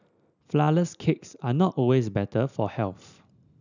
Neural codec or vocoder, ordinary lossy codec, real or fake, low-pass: none; none; real; 7.2 kHz